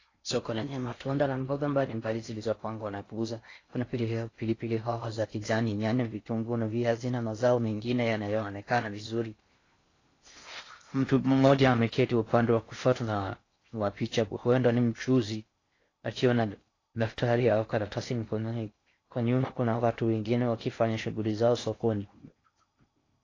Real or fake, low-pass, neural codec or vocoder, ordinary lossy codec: fake; 7.2 kHz; codec, 16 kHz in and 24 kHz out, 0.6 kbps, FocalCodec, streaming, 4096 codes; AAC, 32 kbps